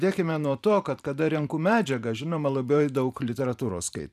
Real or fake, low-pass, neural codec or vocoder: fake; 14.4 kHz; vocoder, 44.1 kHz, 128 mel bands every 512 samples, BigVGAN v2